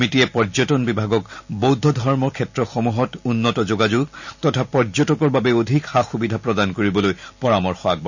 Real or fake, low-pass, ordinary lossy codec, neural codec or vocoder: real; 7.2 kHz; none; none